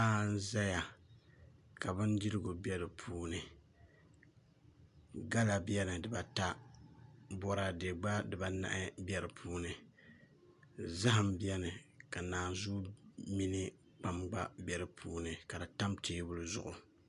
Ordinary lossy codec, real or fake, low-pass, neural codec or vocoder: MP3, 96 kbps; real; 10.8 kHz; none